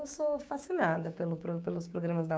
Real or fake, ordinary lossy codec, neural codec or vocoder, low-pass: fake; none; codec, 16 kHz, 6 kbps, DAC; none